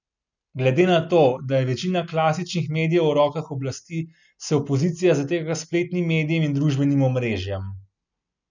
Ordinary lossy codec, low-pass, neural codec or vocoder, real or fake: none; 7.2 kHz; none; real